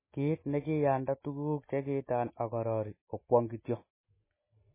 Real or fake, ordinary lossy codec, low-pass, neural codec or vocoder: real; MP3, 16 kbps; 3.6 kHz; none